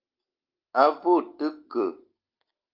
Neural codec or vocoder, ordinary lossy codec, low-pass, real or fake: none; Opus, 32 kbps; 5.4 kHz; real